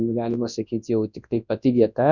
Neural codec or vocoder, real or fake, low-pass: codec, 24 kHz, 0.9 kbps, WavTokenizer, large speech release; fake; 7.2 kHz